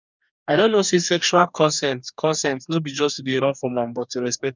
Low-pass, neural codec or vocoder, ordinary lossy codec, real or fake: 7.2 kHz; codec, 44.1 kHz, 2.6 kbps, DAC; none; fake